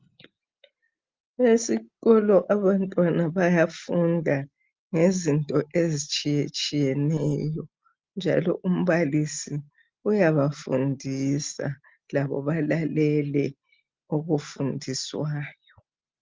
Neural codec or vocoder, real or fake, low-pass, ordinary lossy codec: none; real; 7.2 kHz; Opus, 24 kbps